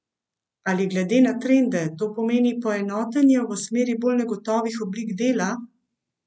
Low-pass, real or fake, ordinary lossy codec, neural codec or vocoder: none; real; none; none